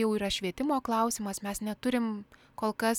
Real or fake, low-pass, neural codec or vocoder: real; 19.8 kHz; none